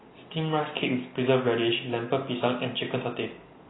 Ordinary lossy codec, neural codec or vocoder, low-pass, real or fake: AAC, 16 kbps; none; 7.2 kHz; real